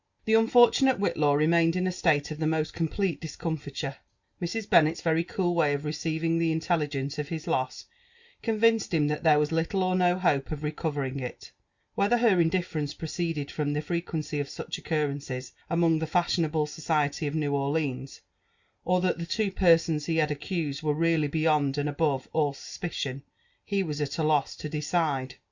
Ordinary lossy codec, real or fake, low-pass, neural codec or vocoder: Opus, 64 kbps; real; 7.2 kHz; none